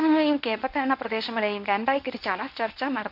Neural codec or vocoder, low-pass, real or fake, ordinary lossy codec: codec, 24 kHz, 0.9 kbps, WavTokenizer, medium speech release version 1; 5.4 kHz; fake; none